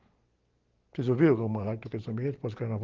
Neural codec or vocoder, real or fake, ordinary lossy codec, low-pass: none; real; Opus, 16 kbps; 7.2 kHz